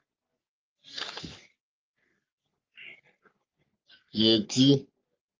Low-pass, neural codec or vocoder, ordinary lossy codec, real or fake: 7.2 kHz; codec, 44.1 kHz, 3.4 kbps, Pupu-Codec; Opus, 24 kbps; fake